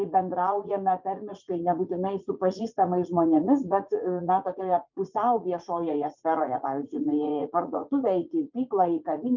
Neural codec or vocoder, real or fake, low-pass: vocoder, 44.1 kHz, 80 mel bands, Vocos; fake; 7.2 kHz